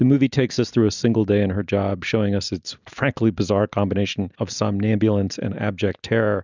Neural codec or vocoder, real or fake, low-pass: none; real; 7.2 kHz